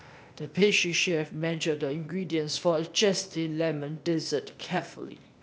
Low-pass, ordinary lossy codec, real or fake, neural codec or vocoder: none; none; fake; codec, 16 kHz, 0.8 kbps, ZipCodec